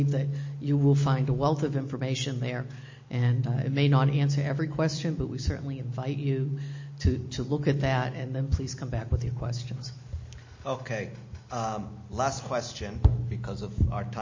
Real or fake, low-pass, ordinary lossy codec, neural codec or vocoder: real; 7.2 kHz; MP3, 32 kbps; none